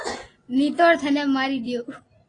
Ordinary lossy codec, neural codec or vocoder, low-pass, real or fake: AAC, 32 kbps; none; 9.9 kHz; real